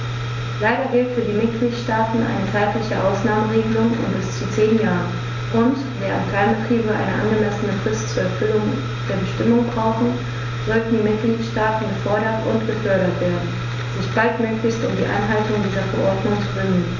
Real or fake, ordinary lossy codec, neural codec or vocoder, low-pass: real; none; none; 7.2 kHz